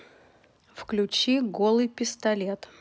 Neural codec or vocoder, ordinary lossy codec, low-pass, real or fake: none; none; none; real